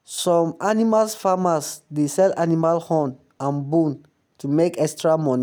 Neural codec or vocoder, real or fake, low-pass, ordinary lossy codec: none; real; none; none